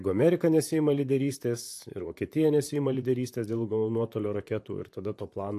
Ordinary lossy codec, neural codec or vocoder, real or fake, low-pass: AAC, 64 kbps; vocoder, 44.1 kHz, 128 mel bands, Pupu-Vocoder; fake; 14.4 kHz